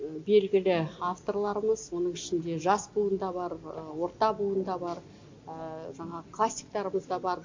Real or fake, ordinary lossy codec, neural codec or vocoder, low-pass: real; MP3, 64 kbps; none; 7.2 kHz